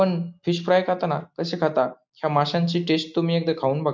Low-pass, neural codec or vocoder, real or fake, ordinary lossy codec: none; none; real; none